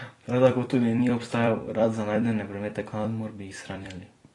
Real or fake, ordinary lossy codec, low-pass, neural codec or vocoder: fake; AAC, 32 kbps; 10.8 kHz; vocoder, 44.1 kHz, 128 mel bands every 256 samples, BigVGAN v2